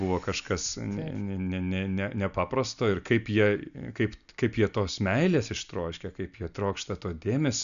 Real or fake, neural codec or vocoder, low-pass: real; none; 7.2 kHz